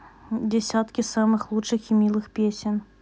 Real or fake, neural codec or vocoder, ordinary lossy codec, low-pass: real; none; none; none